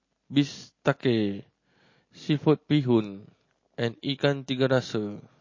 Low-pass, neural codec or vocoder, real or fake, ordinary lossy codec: 7.2 kHz; none; real; MP3, 32 kbps